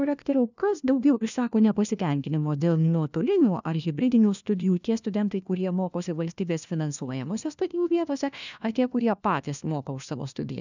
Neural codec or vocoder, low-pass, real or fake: codec, 16 kHz, 1 kbps, FunCodec, trained on LibriTTS, 50 frames a second; 7.2 kHz; fake